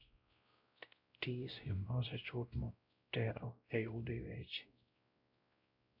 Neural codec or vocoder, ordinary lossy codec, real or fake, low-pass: codec, 16 kHz, 0.5 kbps, X-Codec, WavLM features, trained on Multilingual LibriSpeech; MP3, 48 kbps; fake; 5.4 kHz